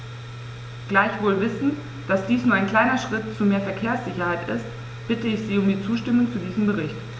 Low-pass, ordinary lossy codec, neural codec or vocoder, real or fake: none; none; none; real